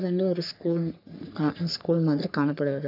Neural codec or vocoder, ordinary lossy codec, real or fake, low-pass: codec, 44.1 kHz, 3.4 kbps, Pupu-Codec; none; fake; 5.4 kHz